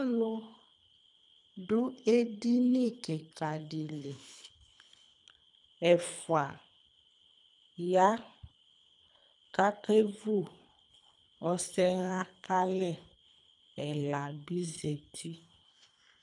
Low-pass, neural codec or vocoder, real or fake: 10.8 kHz; codec, 24 kHz, 3 kbps, HILCodec; fake